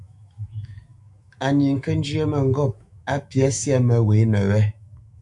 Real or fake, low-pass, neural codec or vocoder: fake; 10.8 kHz; autoencoder, 48 kHz, 128 numbers a frame, DAC-VAE, trained on Japanese speech